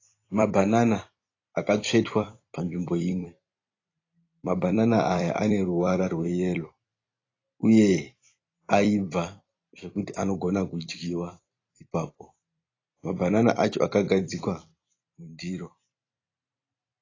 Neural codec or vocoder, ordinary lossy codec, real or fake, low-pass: vocoder, 44.1 kHz, 128 mel bands every 256 samples, BigVGAN v2; AAC, 32 kbps; fake; 7.2 kHz